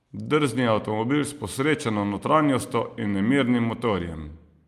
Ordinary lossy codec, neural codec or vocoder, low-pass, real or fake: Opus, 32 kbps; none; 14.4 kHz; real